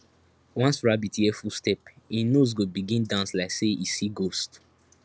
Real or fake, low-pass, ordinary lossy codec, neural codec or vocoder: real; none; none; none